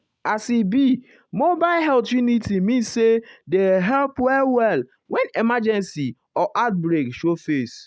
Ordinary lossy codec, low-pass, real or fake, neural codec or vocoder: none; none; real; none